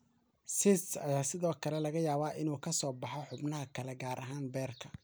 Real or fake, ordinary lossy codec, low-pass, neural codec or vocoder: real; none; none; none